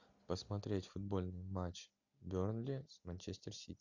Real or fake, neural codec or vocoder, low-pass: real; none; 7.2 kHz